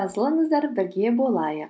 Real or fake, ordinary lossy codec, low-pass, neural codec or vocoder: real; none; none; none